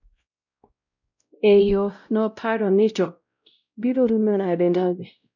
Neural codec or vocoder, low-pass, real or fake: codec, 16 kHz, 0.5 kbps, X-Codec, WavLM features, trained on Multilingual LibriSpeech; 7.2 kHz; fake